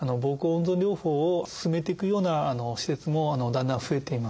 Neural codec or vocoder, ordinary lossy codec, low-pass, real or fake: none; none; none; real